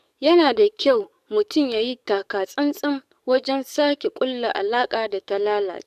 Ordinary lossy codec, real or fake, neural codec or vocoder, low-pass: none; fake; codec, 44.1 kHz, 7.8 kbps, DAC; 14.4 kHz